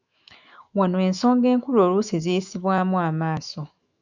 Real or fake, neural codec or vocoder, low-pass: fake; autoencoder, 48 kHz, 128 numbers a frame, DAC-VAE, trained on Japanese speech; 7.2 kHz